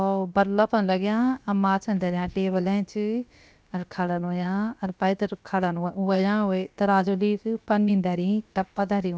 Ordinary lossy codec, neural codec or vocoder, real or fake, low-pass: none; codec, 16 kHz, about 1 kbps, DyCAST, with the encoder's durations; fake; none